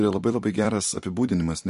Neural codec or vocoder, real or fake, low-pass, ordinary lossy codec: vocoder, 44.1 kHz, 128 mel bands every 256 samples, BigVGAN v2; fake; 14.4 kHz; MP3, 48 kbps